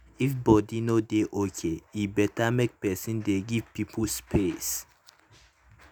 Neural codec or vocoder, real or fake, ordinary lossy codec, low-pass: none; real; none; none